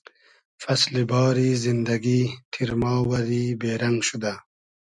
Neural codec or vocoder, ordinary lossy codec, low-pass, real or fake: none; MP3, 96 kbps; 10.8 kHz; real